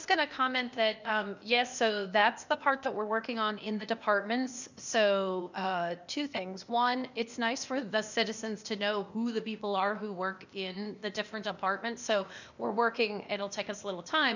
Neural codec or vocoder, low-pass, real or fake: codec, 16 kHz, 0.8 kbps, ZipCodec; 7.2 kHz; fake